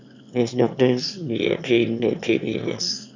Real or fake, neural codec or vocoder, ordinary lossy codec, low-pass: fake; autoencoder, 22.05 kHz, a latent of 192 numbers a frame, VITS, trained on one speaker; none; 7.2 kHz